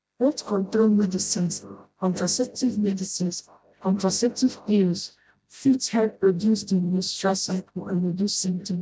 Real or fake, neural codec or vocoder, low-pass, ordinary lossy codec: fake; codec, 16 kHz, 0.5 kbps, FreqCodec, smaller model; none; none